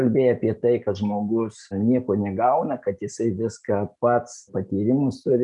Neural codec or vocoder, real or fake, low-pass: none; real; 10.8 kHz